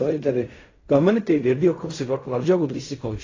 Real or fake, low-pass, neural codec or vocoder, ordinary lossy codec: fake; 7.2 kHz; codec, 16 kHz in and 24 kHz out, 0.4 kbps, LongCat-Audio-Codec, fine tuned four codebook decoder; AAC, 32 kbps